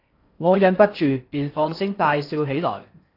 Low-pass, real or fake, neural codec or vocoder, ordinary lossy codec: 5.4 kHz; fake; codec, 16 kHz in and 24 kHz out, 0.6 kbps, FocalCodec, streaming, 4096 codes; AAC, 32 kbps